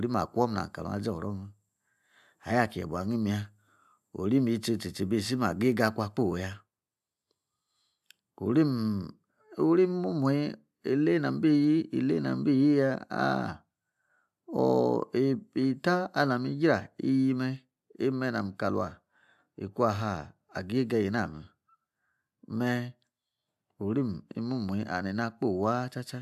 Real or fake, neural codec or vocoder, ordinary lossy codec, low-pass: real; none; AAC, 96 kbps; 14.4 kHz